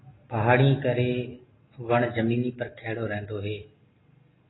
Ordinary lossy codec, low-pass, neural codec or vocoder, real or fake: AAC, 16 kbps; 7.2 kHz; none; real